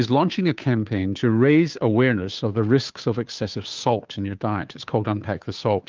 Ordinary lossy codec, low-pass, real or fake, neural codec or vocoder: Opus, 32 kbps; 7.2 kHz; fake; codec, 16 kHz, 2 kbps, FunCodec, trained on Chinese and English, 25 frames a second